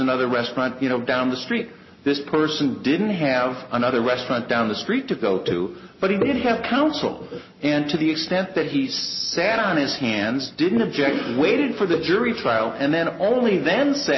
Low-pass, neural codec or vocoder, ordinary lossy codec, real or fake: 7.2 kHz; none; MP3, 24 kbps; real